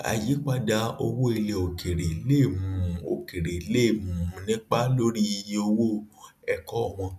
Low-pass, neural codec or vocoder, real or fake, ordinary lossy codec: 14.4 kHz; none; real; none